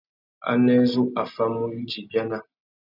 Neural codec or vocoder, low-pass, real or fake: none; 5.4 kHz; real